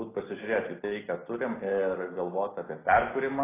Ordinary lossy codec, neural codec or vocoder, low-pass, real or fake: AAC, 16 kbps; none; 3.6 kHz; real